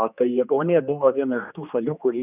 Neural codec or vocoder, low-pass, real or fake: codec, 16 kHz, 2 kbps, X-Codec, HuBERT features, trained on general audio; 3.6 kHz; fake